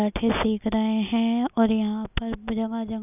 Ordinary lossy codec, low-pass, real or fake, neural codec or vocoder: none; 3.6 kHz; real; none